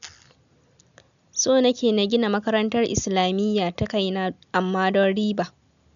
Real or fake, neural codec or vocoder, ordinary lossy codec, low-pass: real; none; none; 7.2 kHz